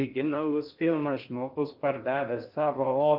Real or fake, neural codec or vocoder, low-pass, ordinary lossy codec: fake; codec, 16 kHz in and 24 kHz out, 0.6 kbps, FocalCodec, streaming, 4096 codes; 5.4 kHz; Opus, 32 kbps